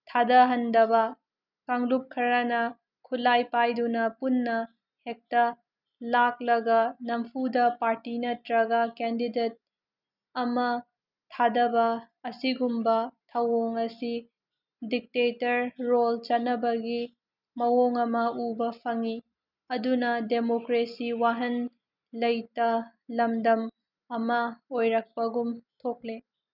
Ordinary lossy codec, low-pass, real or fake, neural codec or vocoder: none; 5.4 kHz; real; none